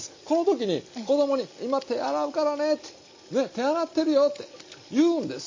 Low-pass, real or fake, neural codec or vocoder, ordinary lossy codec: 7.2 kHz; real; none; MP3, 32 kbps